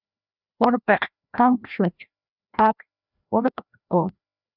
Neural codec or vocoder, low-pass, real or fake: codec, 16 kHz, 1 kbps, FreqCodec, larger model; 5.4 kHz; fake